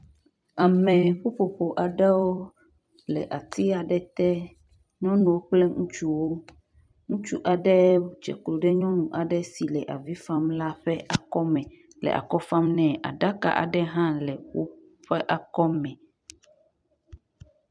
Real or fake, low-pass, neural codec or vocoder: fake; 9.9 kHz; vocoder, 44.1 kHz, 128 mel bands every 256 samples, BigVGAN v2